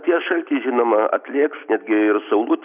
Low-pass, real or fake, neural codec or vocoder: 3.6 kHz; real; none